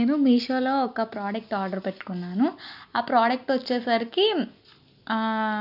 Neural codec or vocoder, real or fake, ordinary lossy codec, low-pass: none; real; none; 5.4 kHz